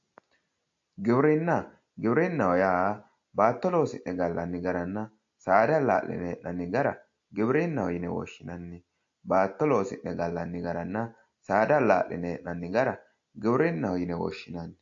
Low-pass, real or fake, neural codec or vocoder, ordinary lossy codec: 7.2 kHz; real; none; AAC, 48 kbps